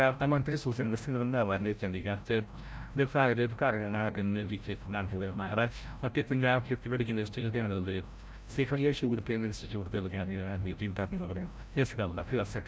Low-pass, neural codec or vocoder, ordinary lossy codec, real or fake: none; codec, 16 kHz, 0.5 kbps, FreqCodec, larger model; none; fake